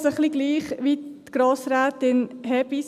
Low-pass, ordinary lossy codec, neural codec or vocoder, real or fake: 14.4 kHz; none; none; real